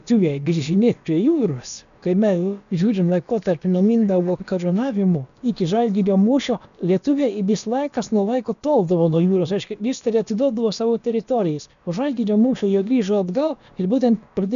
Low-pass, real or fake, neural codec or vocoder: 7.2 kHz; fake; codec, 16 kHz, about 1 kbps, DyCAST, with the encoder's durations